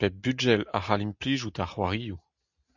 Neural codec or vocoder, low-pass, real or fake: none; 7.2 kHz; real